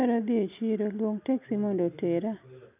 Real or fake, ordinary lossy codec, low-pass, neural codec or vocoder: real; none; 3.6 kHz; none